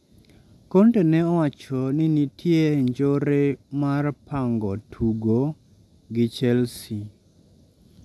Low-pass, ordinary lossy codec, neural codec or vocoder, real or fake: none; none; none; real